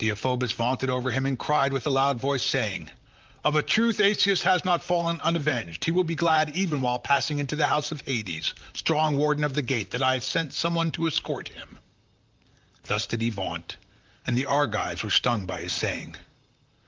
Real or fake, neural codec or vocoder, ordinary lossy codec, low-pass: fake; vocoder, 44.1 kHz, 128 mel bands, Pupu-Vocoder; Opus, 32 kbps; 7.2 kHz